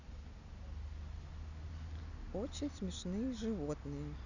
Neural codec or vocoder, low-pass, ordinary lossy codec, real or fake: none; 7.2 kHz; none; real